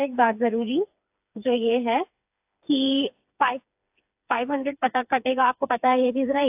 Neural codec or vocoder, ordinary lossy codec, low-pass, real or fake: codec, 16 kHz, 4 kbps, FreqCodec, smaller model; AAC, 32 kbps; 3.6 kHz; fake